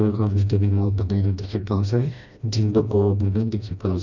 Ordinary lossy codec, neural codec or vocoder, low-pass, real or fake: none; codec, 16 kHz, 1 kbps, FreqCodec, smaller model; 7.2 kHz; fake